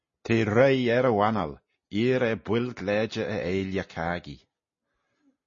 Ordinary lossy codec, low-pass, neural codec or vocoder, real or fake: MP3, 32 kbps; 7.2 kHz; none; real